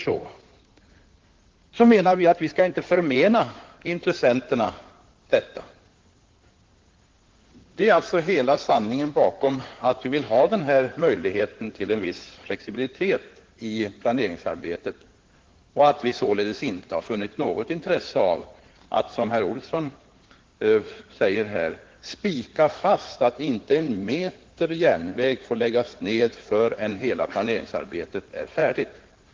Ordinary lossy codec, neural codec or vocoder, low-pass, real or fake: Opus, 16 kbps; codec, 16 kHz in and 24 kHz out, 2.2 kbps, FireRedTTS-2 codec; 7.2 kHz; fake